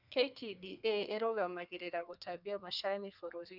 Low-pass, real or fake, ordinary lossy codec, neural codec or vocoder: 5.4 kHz; fake; none; codec, 32 kHz, 1.9 kbps, SNAC